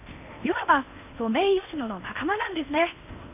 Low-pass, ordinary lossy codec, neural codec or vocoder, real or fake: 3.6 kHz; none; codec, 16 kHz in and 24 kHz out, 0.8 kbps, FocalCodec, streaming, 65536 codes; fake